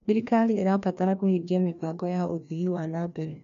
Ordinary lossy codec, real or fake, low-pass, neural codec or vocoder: none; fake; 7.2 kHz; codec, 16 kHz, 1 kbps, FreqCodec, larger model